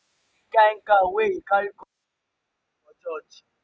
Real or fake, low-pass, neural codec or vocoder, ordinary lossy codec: real; none; none; none